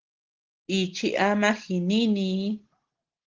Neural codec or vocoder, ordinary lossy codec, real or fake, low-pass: none; Opus, 16 kbps; real; 7.2 kHz